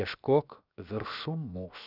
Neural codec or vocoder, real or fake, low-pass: codec, 16 kHz, 0.7 kbps, FocalCodec; fake; 5.4 kHz